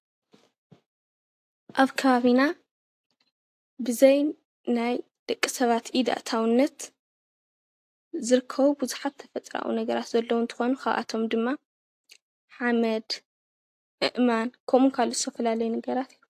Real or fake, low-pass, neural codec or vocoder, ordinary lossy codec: real; 14.4 kHz; none; AAC, 64 kbps